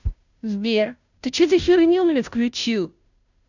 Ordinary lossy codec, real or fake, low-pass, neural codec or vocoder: none; fake; 7.2 kHz; codec, 16 kHz, 0.5 kbps, FunCodec, trained on Chinese and English, 25 frames a second